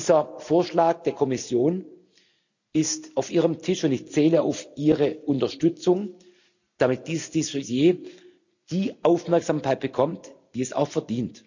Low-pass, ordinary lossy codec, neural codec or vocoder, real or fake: 7.2 kHz; none; none; real